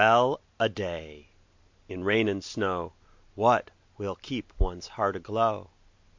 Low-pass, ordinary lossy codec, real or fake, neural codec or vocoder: 7.2 kHz; MP3, 48 kbps; real; none